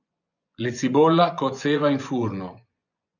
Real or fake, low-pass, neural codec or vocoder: fake; 7.2 kHz; vocoder, 24 kHz, 100 mel bands, Vocos